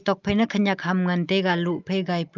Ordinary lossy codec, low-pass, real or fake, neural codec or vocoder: Opus, 24 kbps; 7.2 kHz; real; none